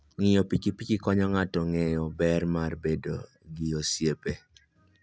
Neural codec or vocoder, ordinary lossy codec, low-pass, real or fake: none; none; none; real